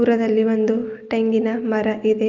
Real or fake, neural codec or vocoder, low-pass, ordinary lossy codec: real; none; 7.2 kHz; Opus, 24 kbps